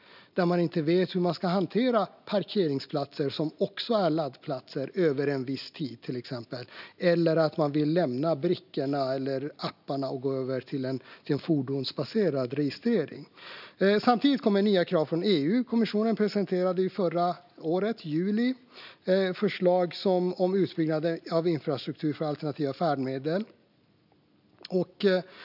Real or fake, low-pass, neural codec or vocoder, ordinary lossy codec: real; 5.4 kHz; none; none